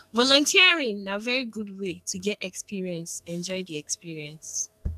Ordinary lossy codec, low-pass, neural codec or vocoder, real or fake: none; 14.4 kHz; codec, 44.1 kHz, 2.6 kbps, SNAC; fake